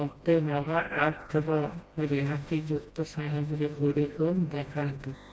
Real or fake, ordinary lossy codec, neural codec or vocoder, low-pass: fake; none; codec, 16 kHz, 1 kbps, FreqCodec, smaller model; none